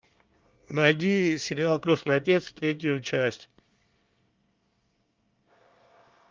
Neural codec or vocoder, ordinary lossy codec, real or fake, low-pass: codec, 24 kHz, 1 kbps, SNAC; Opus, 24 kbps; fake; 7.2 kHz